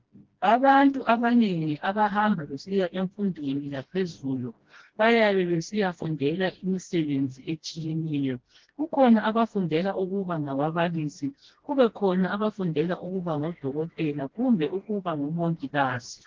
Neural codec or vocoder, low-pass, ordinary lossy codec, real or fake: codec, 16 kHz, 1 kbps, FreqCodec, smaller model; 7.2 kHz; Opus, 16 kbps; fake